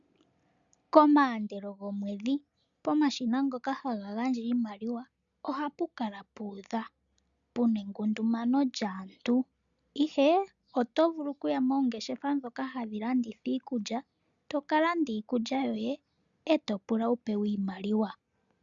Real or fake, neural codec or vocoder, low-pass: real; none; 7.2 kHz